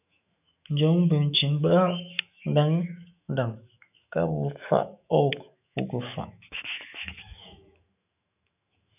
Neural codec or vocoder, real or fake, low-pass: autoencoder, 48 kHz, 128 numbers a frame, DAC-VAE, trained on Japanese speech; fake; 3.6 kHz